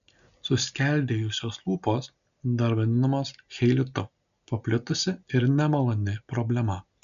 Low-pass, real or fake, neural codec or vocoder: 7.2 kHz; real; none